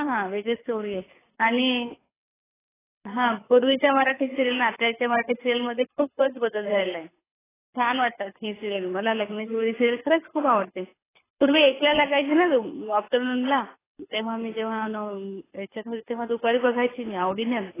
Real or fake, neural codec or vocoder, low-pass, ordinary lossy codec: fake; codec, 24 kHz, 6 kbps, HILCodec; 3.6 kHz; AAC, 16 kbps